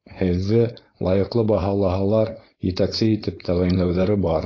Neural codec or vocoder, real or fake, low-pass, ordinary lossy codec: codec, 16 kHz, 4.8 kbps, FACodec; fake; 7.2 kHz; AAC, 32 kbps